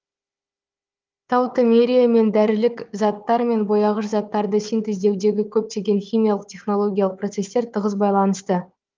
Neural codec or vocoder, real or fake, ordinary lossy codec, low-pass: codec, 16 kHz, 4 kbps, FunCodec, trained on Chinese and English, 50 frames a second; fake; Opus, 24 kbps; 7.2 kHz